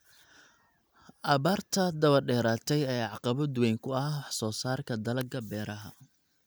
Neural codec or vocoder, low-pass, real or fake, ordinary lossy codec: none; none; real; none